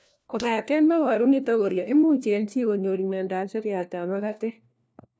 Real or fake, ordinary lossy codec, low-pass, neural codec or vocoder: fake; none; none; codec, 16 kHz, 1 kbps, FunCodec, trained on LibriTTS, 50 frames a second